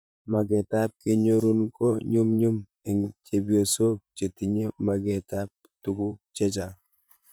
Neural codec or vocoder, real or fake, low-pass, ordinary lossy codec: vocoder, 44.1 kHz, 128 mel bands, Pupu-Vocoder; fake; none; none